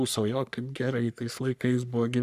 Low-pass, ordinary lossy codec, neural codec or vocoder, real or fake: 14.4 kHz; Opus, 64 kbps; codec, 44.1 kHz, 3.4 kbps, Pupu-Codec; fake